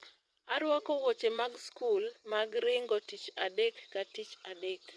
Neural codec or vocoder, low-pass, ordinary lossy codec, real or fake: vocoder, 22.05 kHz, 80 mel bands, WaveNeXt; none; none; fake